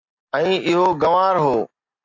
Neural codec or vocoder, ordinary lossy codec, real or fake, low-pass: none; MP3, 48 kbps; real; 7.2 kHz